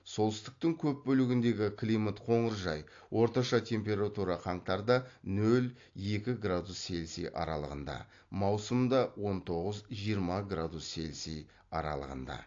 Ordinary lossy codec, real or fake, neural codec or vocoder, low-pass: AAC, 48 kbps; real; none; 7.2 kHz